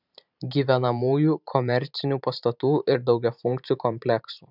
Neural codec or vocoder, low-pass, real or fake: vocoder, 44.1 kHz, 128 mel bands every 512 samples, BigVGAN v2; 5.4 kHz; fake